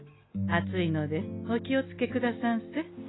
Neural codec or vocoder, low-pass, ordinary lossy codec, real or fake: none; 7.2 kHz; AAC, 16 kbps; real